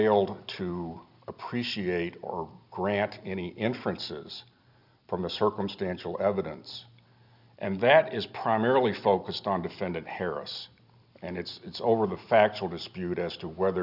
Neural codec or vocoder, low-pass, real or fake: none; 5.4 kHz; real